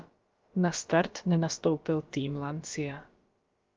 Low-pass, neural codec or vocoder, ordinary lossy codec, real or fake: 7.2 kHz; codec, 16 kHz, about 1 kbps, DyCAST, with the encoder's durations; Opus, 32 kbps; fake